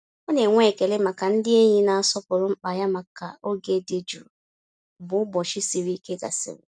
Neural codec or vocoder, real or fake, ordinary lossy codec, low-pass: none; real; none; none